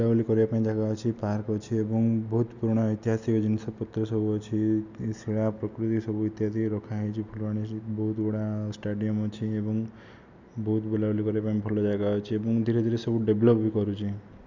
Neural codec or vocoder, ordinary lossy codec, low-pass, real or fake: none; none; 7.2 kHz; real